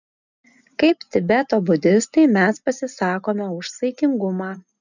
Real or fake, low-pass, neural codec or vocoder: real; 7.2 kHz; none